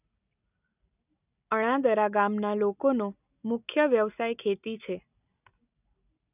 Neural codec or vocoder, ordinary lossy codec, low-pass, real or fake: none; none; 3.6 kHz; real